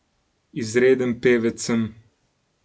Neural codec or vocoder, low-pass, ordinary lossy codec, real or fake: none; none; none; real